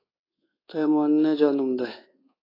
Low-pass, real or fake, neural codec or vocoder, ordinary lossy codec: 5.4 kHz; fake; codec, 24 kHz, 1.2 kbps, DualCodec; AAC, 24 kbps